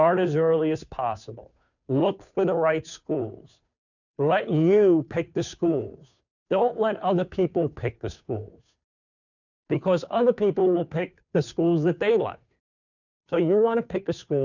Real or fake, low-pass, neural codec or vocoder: fake; 7.2 kHz; codec, 16 kHz, 2 kbps, FunCodec, trained on Chinese and English, 25 frames a second